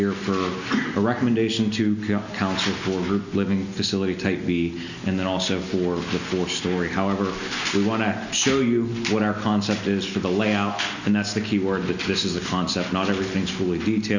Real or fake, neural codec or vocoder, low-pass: real; none; 7.2 kHz